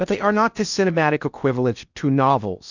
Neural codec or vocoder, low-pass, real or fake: codec, 16 kHz in and 24 kHz out, 0.6 kbps, FocalCodec, streaming, 2048 codes; 7.2 kHz; fake